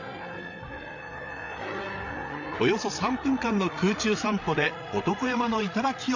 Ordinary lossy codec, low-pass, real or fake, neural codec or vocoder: none; 7.2 kHz; fake; codec, 16 kHz, 8 kbps, FreqCodec, larger model